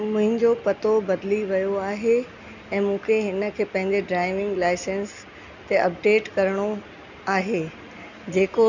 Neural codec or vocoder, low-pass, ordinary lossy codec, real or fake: none; 7.2 kHz; none; real